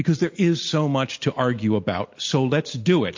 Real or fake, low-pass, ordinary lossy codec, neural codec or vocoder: real; 7.2 kHz; MP3, 48 kbps; none